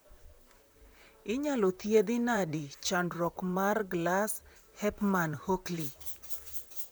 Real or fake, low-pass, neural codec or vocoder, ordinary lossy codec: fake; none; vocoder, 44.1 kHz, 128 mel bands, Pupu-Vocoder; none